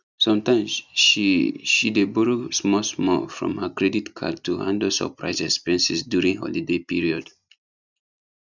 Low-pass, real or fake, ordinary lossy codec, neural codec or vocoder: 7.2 kHz; real; none; none